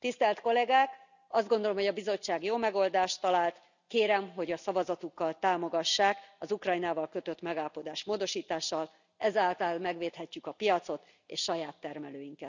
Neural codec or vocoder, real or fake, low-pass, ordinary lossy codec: none; real; 7.2 kHz; none